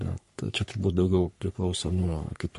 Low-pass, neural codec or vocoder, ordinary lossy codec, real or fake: 14.4 kHz; codec, 44.1 kHz, 3.4 kbps, Pupu-Codec; MP3, 48 kbps; fake